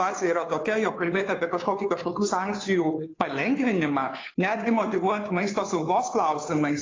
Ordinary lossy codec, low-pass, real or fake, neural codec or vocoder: AAC, 32 kbps; 7.2 kHz; fake; codec, 16 kHz, 2 kbps, FunCodec, trained on Chinese and English, 25 frames a second